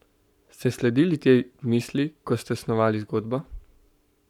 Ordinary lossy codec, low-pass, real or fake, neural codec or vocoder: none; 19.8 kHz; fake; codec, 44.1 kHz, 7.8 kbps, Pupu-Codec